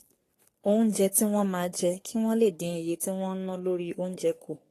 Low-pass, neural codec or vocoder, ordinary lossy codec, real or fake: 14.4 kHz; codec, 44.1 kHz, 3.4 kbps, Pupu-Codec; AAC, 48 kbps; fake